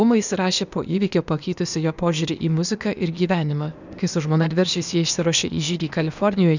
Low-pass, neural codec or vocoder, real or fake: 7.2 kHz; codec, 16 kHz, 0.8 kbps, ZipCodec; fake